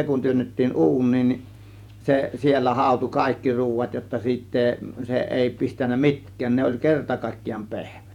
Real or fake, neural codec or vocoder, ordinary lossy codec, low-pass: fake; vocoder, 48 kHz, 128 mel bands, Vocos; none; 19.8 kHz